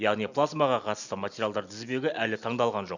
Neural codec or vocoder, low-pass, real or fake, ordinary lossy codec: none; 7.2 kHz; real; none